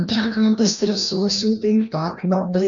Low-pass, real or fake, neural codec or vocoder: 7.2 kHz; fake; codec, 16 kHz, 1 kbps, FreqCodec, larger model